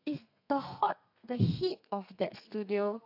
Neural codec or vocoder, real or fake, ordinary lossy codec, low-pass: codec, 32 kHz, 1.9 kbps, SNAC; fake; AAC, 48 kbps; 5.4 kHz